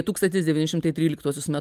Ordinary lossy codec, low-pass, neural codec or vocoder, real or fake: Opus, 32 kbps; 14.4 kHz; none; real